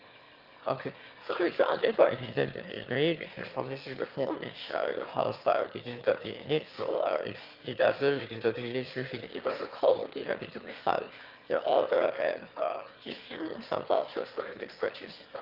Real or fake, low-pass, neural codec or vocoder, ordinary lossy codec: fake; 5.4 kHz; autoencoder, 22.05 kHz, a latent of 192 numbers a frame, VITS, trained on one speaker; Opus, 32 kbps